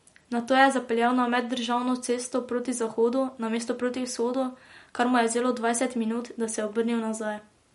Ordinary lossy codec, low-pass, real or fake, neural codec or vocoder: MP3, 48 kbps; 19.8 kHz; real; none